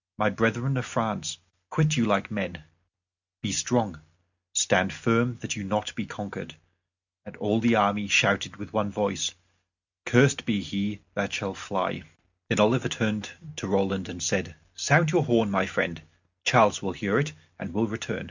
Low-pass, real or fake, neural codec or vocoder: 7.2 kHz; real; none